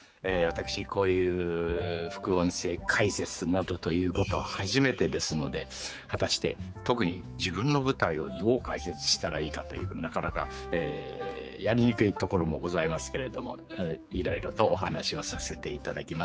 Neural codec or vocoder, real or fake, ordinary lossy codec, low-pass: codec, 16 kHz, 4 kbps, X-Codec, HuBERT features, trained on general audio; fake; none; none